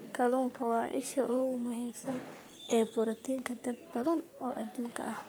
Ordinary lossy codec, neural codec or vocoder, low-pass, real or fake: none; codec, 44.1 kHz, 3.4 kbps, Pupu-Codec; none; fake